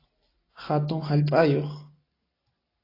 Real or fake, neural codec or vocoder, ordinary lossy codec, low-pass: real; none; AAC, 24 kbps; 5.4 kHz